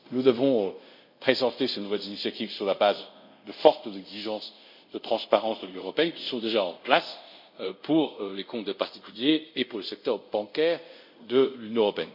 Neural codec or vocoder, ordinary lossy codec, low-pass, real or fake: codec, 24 kHz, 0.5 kbps, DualCodec; MP3, 48 kbps; 5.4 kHz; fake